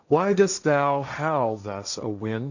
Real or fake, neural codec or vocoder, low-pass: fake; codec, 16 kHz, 1.1 kbps, Voila-Tokenizer; 7.2 kHz